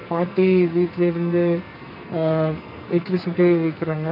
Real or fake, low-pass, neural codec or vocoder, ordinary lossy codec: fake; 5.4 kHz; codec, 44.1 kHz, 2.6 kbps, SNAC; none